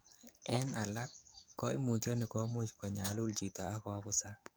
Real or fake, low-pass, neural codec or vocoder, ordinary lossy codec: fake; none; codec, 44.1 kHz, 7.8 kbps, DAC; none